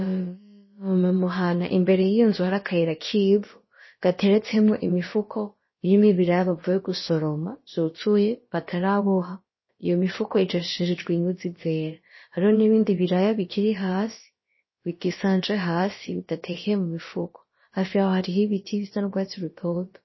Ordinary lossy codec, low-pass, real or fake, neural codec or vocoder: MP3, 24 kbps; 7.2 kHz; fake; codec, 16 kHz, about 1 kbps, DyCAST, with the encoder's durations